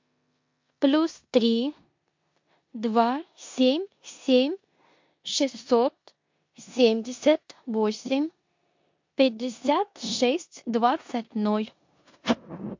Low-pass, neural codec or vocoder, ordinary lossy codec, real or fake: 7.2 kHz; codec, 16 kHz in and 24 kHz out, 0.9 kbps, LongCat-Audio-Codec, four codebook decoder; MP3, 48 kbps; fake